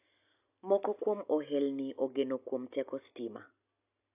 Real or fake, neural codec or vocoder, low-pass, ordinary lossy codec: real; none; 3.6 kHz; AAC, 32 kbps